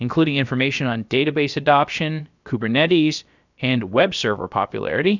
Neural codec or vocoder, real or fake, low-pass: codec, 16 kHz, about 1 kbps, DyCAST, with the encoder's durations; fake; 7.2 kHz